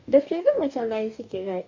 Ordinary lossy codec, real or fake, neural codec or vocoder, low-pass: MP3, 64 kbps; fake; codec, 44.1 kHz, 2.6 kbps, DAC; 7.2 kHz